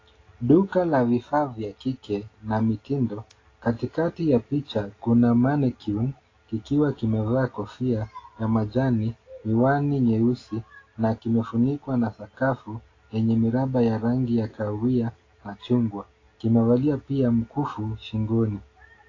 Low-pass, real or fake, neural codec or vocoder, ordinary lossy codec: 7.2 kHz; real; none; AAC, 32 kbps